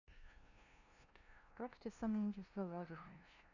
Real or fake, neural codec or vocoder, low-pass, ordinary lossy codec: fake; codec, 16 kHz, 0.5 kbps, FunCodec, trained on LibriTTS, 25 frames a second; 7.2 kHz; none